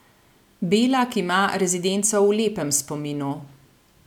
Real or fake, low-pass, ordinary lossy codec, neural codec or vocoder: real; 19.8 kHz; none; none